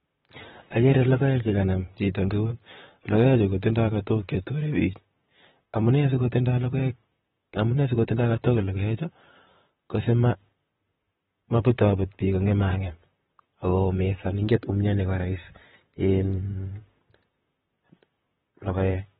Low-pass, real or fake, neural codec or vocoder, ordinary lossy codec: 19.8 kHz; fake; vocoder, 44.1 kHz, 128 mel bands, Pupu-Vocoder; AAC, 16 kbps